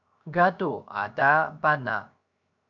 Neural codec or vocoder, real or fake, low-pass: codec, 16 kHz, 0.7 kbps, FocalCodec; fake; 7.2 kHz